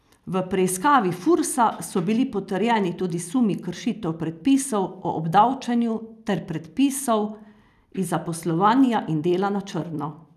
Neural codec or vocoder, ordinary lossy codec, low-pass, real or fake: none; none; 14.4 kHz; real